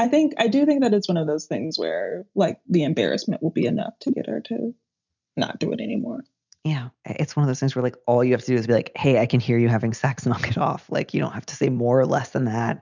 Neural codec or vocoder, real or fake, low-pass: none; real; 7.2 kHz